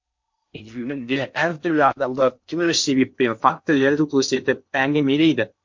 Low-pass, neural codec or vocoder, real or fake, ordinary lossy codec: 7.2 kHz; codec, 16 kHz in and 24 kHz out, 0.6 kbps, FocalCodec, streaming, 4096 codes; fake; MP3, 48 kbps